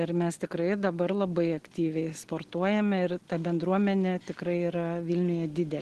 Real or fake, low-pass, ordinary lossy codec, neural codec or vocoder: real; 10.8 kHz; Opus, 16 kbps; none